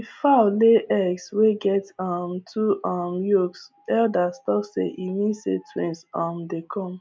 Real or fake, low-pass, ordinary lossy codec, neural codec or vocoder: real; none; none; none